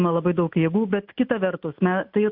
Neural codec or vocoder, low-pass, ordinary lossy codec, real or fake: none; 5.4 kHz; MP3, 48 kbps; real